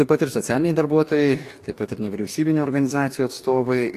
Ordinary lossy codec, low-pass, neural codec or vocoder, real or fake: AAC, 64 kbps; 14.4 kHz; codec, 44.1 kHz, 2.6 kbps, DAC; fake